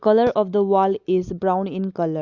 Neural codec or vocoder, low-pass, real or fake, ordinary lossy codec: none; 7.2 kHz; real; Opus, 64 kbps